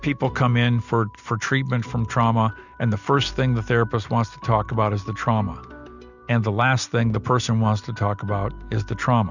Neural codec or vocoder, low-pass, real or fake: none; 7.2 kHz; real